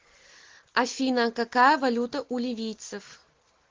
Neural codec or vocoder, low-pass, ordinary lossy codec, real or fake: none; 7.2 kHz; Opus, 16 kbps; real